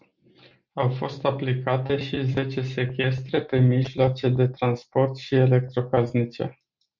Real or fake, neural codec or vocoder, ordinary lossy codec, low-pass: real; none; MP3, 64 kbps; 7.2 kHz